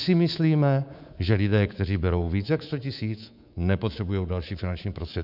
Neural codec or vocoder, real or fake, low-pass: codec, 24 kHz, 3.1 kbps, DualCodec; fake; 5.4 kHz